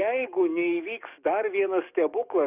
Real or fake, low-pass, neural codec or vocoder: fake; 3.6 kHz; codec, 44.1 kHz, 7.8 kbps, DAC